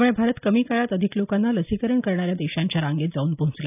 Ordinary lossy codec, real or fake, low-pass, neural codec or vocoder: none; real; 3.6 kHz; none